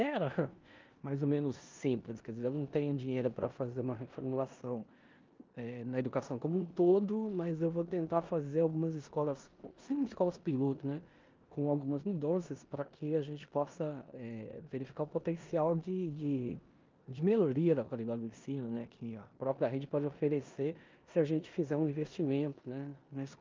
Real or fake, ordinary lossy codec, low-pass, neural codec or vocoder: fake; Opus, 24 kbps; 7.2 kHz; codec, 16 kHz in and 24 kHz out, 0.9 kbps, LongCat-Audio-Codec, four codebook decoder